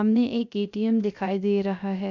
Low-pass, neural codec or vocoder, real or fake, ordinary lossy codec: 7.2 kHz; codec, 16 kHz, 0.3 kbps, FocalCodec; fake; none